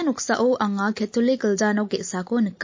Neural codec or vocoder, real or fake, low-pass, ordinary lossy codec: none; real; 7.2 kHz; MP3, 32 kbps